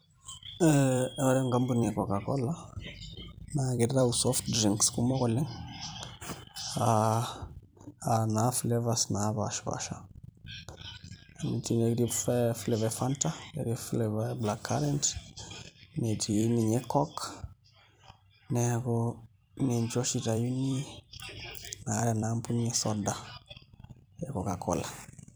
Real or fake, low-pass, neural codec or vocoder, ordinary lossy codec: fake; none; vocoder, 44.1 kHz, 128 mel bands every 256 samples, BigVGAN v2; none